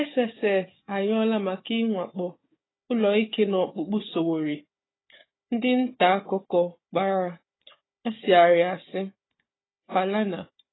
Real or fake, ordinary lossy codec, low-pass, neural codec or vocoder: fake; AAC, 16 kbps; 7.2 kHz; codec, 16 kHz, 4 kbps, FunCodec, trained on Chinese and English, 50 frames a second